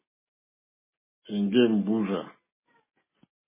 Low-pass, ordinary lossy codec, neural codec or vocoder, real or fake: 3.6 kHz; MP3, 16 kbps; none; real